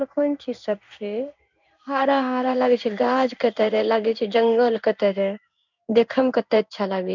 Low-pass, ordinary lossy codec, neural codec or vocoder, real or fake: 7.2 kHz; none; codec, 16 kHz in and 24 kHz out, 1 kbps, XY-Tokenizer; fake